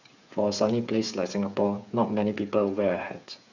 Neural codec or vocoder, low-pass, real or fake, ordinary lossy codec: codec, 16 kHz, 8 kbps, FreqCodec, smaller model; 7.2 kHz; fake; none